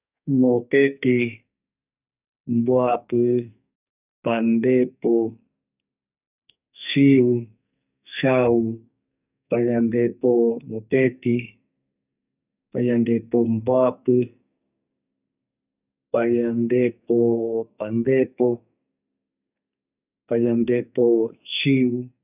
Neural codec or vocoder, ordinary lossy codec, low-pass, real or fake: codec, 44.1 kHz, 2.6 kbps, SNAC; none; 3.6 kHz; fake